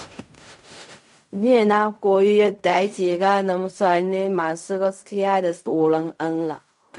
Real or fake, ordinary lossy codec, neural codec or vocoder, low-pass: fake; MP3, 64 kbps; codec, 16 kHz in and 24 kHz out, 0.4 kbps, LongCat-Audio-Codec, fine tuned four codebook decoder; 10.8 kHz